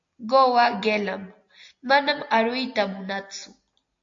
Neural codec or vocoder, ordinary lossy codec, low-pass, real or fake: none; AAC, 48 kbps; 7.2 kHz; real